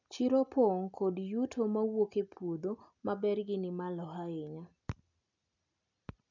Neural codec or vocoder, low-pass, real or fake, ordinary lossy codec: none; 7.2 kHz; real; none